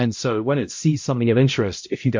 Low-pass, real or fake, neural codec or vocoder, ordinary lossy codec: 7.2 kHz; fake; codec, 16 kHz, 0.5 kbps, X-Codec, HuBERT features, trained on balanced general audio; MP3, 48 kbps